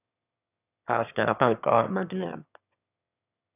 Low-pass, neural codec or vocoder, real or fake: 3.6 kHz; autoencoder, 22.05 kHz, a latent of 192 numbers a frame, VITS, trained on one speaker; fake